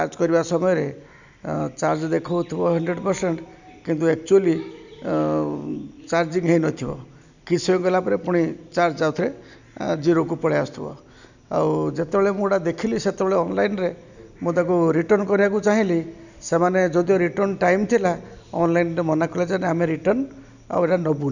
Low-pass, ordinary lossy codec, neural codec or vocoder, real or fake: 7.2 kHz; none; none; real